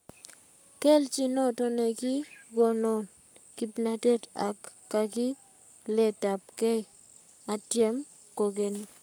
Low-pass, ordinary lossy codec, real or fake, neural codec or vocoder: none; none; fake; codec, 44.1 kHz, 7.8 kbps, Pupu-Codec